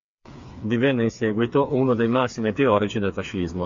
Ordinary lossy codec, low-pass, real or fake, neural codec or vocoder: MP3, 48 kbps; 7.2 kHz; fake; codec, 16 kHz, 4 kbps, FreqCodec, smaller model